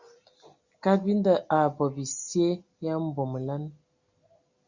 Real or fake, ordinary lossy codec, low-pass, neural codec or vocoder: real; Opus, 64 kbps; 7.2 kHz; none